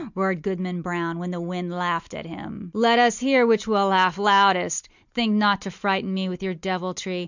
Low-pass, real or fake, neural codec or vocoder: 7.2 kHz; real; none